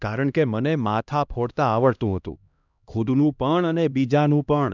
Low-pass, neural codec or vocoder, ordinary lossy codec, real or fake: 7.2 kHz; codec, 16 kHz, 1 kbps, X-Codec, HuBERT features, trained on LibriSpeech; none; fake